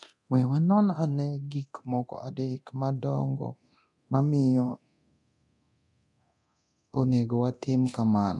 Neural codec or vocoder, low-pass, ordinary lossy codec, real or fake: codec, 24 kHz, 0.9 kbps, DualCodec; 10.8 kHz; none; fake